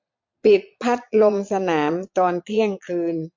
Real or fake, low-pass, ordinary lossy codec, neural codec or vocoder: fake; 7.2 kHz; MP3, 48 kbps; vocoder, 22.05 kHz, 80 mel bands, Vocos